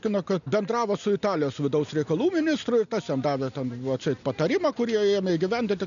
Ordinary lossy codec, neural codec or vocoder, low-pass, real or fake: MP3, 96 kbps; none; 7.2 kHz; real